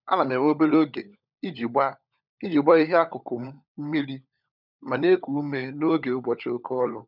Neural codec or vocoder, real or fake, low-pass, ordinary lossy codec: codec, 16 kHz, 16 kbps, FunCodec, trained on LibriTTS, 50 frames a second; fake; 5.4 kHz; none